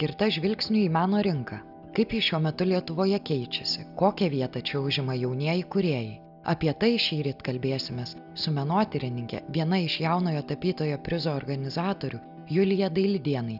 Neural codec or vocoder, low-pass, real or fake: none; 5.4 kHz; real